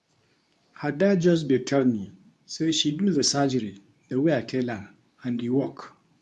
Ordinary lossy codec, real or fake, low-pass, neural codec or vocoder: none; fake; none; codec, 24 kHz, 0.9 kbps, WavTokenizer, medium speech release version 2